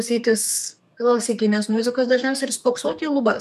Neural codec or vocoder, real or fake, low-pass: codec, 32 kHz, 1.9 kbps, SNAC; fake; 14.4 kHz